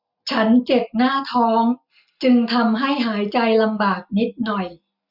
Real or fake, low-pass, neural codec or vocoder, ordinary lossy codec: real; 5.4 kHz; none; none